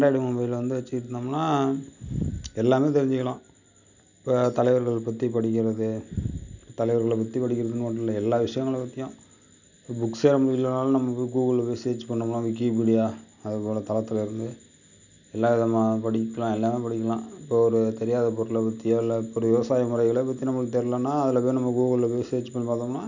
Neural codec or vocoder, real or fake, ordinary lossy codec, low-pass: none; real; none; 7.2 kHz